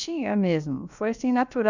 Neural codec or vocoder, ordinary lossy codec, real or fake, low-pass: codec, 16 kHz, about 1 kbps, DyCAST, with the encoder's durations; none; fake; 7.2 kHz